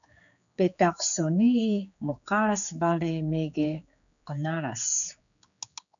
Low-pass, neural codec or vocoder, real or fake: 7.2 kHz; codec, 16 kHz, 4 kbps, X-Codec, HuBERT features, trained on general audio; fake